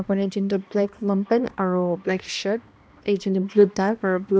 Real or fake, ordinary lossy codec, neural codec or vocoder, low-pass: fake; none; codec, 16 kHz, 1 kbps, X-Codec, HuBERT features, trained on balanced general audio; none